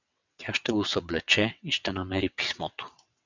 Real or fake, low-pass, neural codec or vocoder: fake; 7.2 kHz; vocoder, 22.05 kHz, 80 mel bands, WaveNeXt